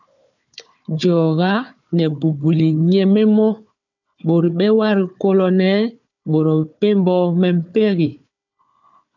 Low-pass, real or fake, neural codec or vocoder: 7.2 kHz; fake; codec, 16 kHz, 4 kbps, FunCodec, trained on Chinese and English, 50 frames a second